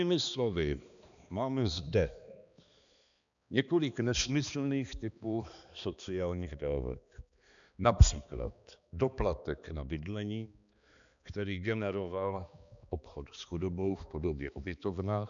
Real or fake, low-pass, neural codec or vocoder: fake; 7.2 kHz; codec, 16 kHz, 2 kbps, X-Codec, HuBERT features, trained on balanced general audio